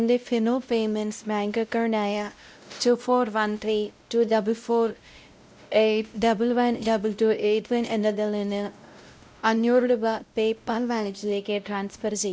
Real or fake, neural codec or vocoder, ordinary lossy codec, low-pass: fake; codec, 16 kHz, 0.5 kbps, X-Codec, WavLM features, trained on Multilingual LibriSpeech; none; none